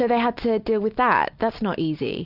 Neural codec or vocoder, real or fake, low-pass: none; real; 5.4 kHz